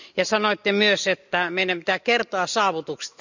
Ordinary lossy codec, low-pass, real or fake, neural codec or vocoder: none; 7.2 kHz; real; none